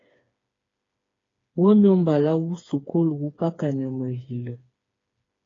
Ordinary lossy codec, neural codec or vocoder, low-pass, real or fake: AAC, 32 kbps; codec, 16 kHz, 4 kbps, FreqCodec, smaller model; 7.2 kHz; fake